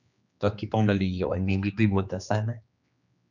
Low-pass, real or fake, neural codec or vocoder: 7.2 kHz; fake; codec, 16 kHz, 2 kbps, X-Codec, HuBERT features, trained on general audio